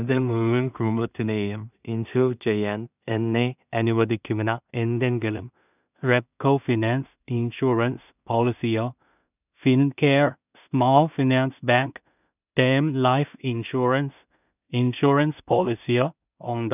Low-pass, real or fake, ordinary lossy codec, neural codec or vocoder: 3.6 kHz; fake; none; codec, 16 kHz in and 24 kHz out, 0.4 kbps, LongCat-Audio-Codec, two codebook decoder